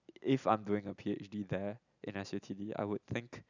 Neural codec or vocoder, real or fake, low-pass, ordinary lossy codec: none; real; 7.2 kHz; none